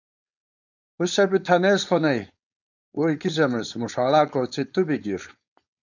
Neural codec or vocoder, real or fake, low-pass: codec, 16 kHz, 4.8 kbps, FACodec; fake; 7.2 kHz